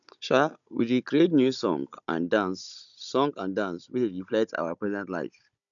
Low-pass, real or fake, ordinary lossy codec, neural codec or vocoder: 7.2 kHz; fake; none; codec, 16 kHz, 16 kbps, FunCodec, trained on Chinese and English, 50 frames a second